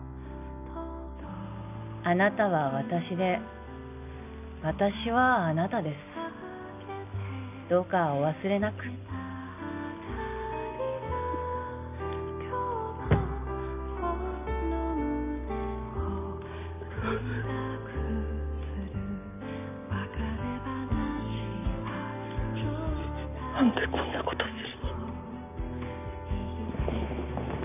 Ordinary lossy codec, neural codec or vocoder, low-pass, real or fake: none; none; 3.6 kHz; real